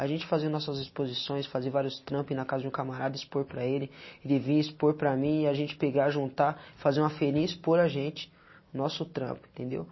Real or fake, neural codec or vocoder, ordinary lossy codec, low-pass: real; none; MP3, 24 kbps; 7.2 kHz